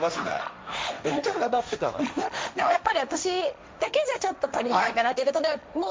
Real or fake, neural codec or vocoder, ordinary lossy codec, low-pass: fake; codec, 16 kHz, 1.1 kbps, Voila-Tokenizer; none; none